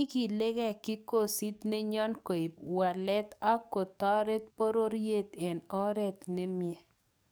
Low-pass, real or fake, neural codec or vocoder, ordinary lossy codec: none; fake; codec, 44.1 kHz, 7.8 kbps, DAC; none